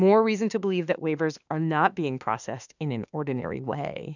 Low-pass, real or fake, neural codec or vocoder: 7.2 kHz; fake; autoencoder, 48 kHz, 32 numbers a frame, DAC-VAE, trained on Japanese speech